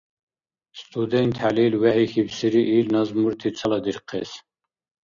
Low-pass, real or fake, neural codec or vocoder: 7.2 kHz; real; none